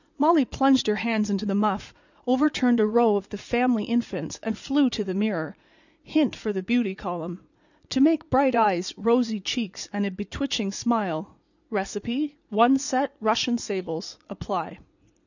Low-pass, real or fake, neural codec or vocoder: 7.2 kHz; fake; vocoder, 44.1 kHz, 80 mel bands, Vocos